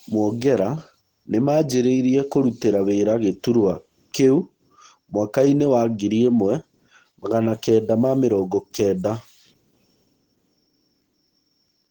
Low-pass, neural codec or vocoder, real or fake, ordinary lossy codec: 19.8 kHz; none; real; Opus, 16 kbps